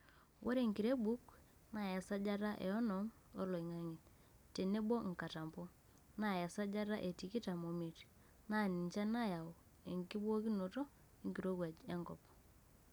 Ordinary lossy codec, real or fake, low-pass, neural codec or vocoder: none; real; none; none